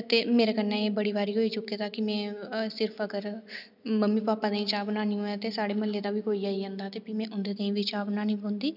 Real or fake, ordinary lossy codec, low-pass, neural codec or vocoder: real; none; 5.4 kHz; none